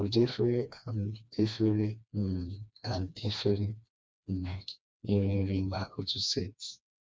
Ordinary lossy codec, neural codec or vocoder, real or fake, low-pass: none; codec, 16 kHz, 2 kbps, FreqCodec, smaller model; fake; none